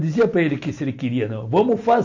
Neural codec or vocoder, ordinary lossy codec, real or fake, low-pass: none; AAC, 48 kbps; real; 7.2 kHz